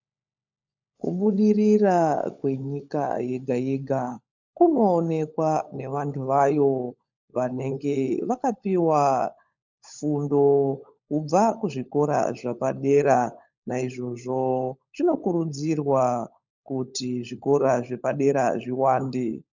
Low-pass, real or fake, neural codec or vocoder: 7.2 kHz; fake; codec, 16 kHz, 16 kbps, FunCodec, trained on LibriTTS, 50 frames a second